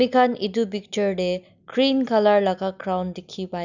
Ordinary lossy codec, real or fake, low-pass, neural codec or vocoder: none; real; 7.2 kHz; none